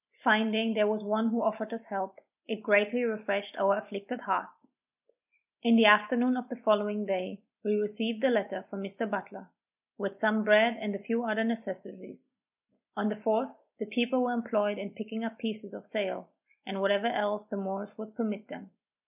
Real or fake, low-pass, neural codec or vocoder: real; 3.6 kHz; none